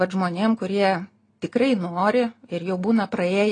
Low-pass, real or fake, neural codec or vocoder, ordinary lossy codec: 9.9 kHz; real; none; AAC, 32 kbps